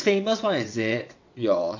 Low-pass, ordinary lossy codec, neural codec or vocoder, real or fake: 7.2 kHz; AAC, 48 kbps; vocoder, 22.05 kHz, 80 mel bands, WaveNeXt; fake